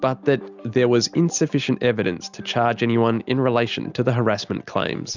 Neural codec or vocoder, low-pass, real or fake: none; 7.2 kHz; real